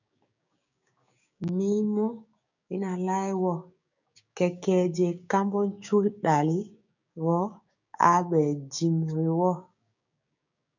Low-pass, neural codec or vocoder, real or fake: 7.2 kHz; codec, 16 kHz, 6 kbps, DAC; fake